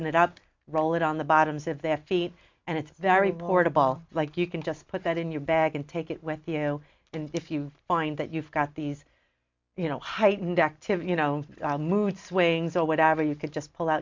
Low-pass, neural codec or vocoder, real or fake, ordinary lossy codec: 7.2 kHz; none; real; MP3, 64 kbps